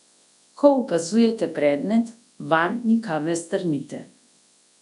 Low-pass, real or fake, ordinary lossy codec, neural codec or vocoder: 10.8 kHz; fake; none; codec, 24 kHz, 0.9 kbps, WavTokenizer, large speech release